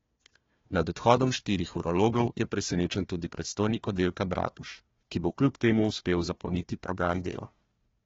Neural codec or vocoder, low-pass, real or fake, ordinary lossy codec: codec, 16 kHz, 1 kbps, FunCodec, trained on Chinese and English, 50 frames a second; 7.2 kHz; fake; AAC, 24 kbps